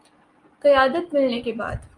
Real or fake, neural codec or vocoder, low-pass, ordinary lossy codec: real; none; 10.8 kHz; Opus, 32 kbps